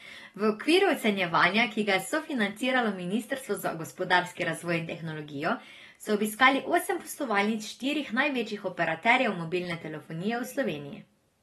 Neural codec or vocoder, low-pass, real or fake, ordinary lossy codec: none; 19.8 kHz; real; AAC, 32 kbps